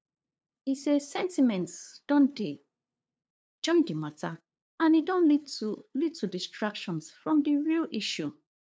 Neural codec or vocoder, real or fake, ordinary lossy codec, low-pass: codec, 16 kHz, 2 kbps, FunCodec, trained on LibriTTS, 25 frames a second; fake; none; none